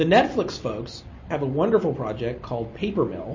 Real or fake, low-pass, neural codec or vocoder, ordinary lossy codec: real; 7.2 kHz; none; MP3, 32 kbps